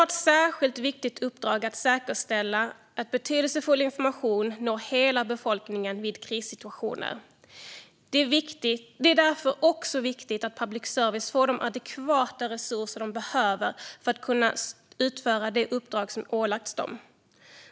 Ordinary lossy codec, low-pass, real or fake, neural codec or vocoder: none; none; real; none